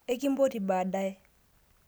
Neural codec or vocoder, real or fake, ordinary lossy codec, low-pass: none; real; none; none